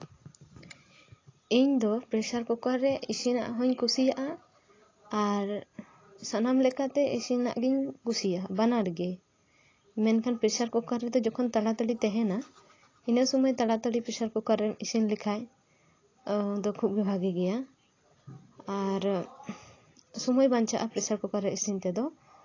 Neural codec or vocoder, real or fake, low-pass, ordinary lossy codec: none; real; 7.2 kHz; AAC, 32 kbps